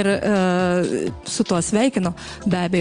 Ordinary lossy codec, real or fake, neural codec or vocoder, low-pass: Opus, 32 kbps; real; none; 9.9 kHz